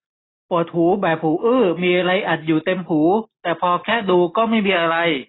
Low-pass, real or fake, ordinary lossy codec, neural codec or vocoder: 7.2 kHz; real; AAC, 16 kbps; none